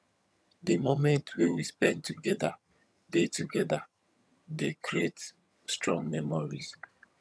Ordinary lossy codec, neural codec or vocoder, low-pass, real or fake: none; vocoder, 22.05 kHz, 80 mel bands, HiFi-GAN; none; fake